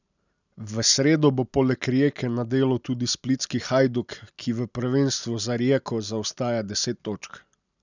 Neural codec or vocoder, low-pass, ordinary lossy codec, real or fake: none; 7.2 kHz; none; real